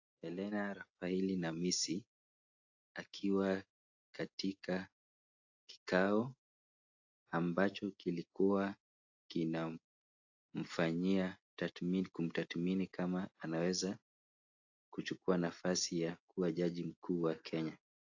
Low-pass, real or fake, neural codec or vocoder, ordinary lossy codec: 7.2 kHz; real; none; AAC, 48 kbps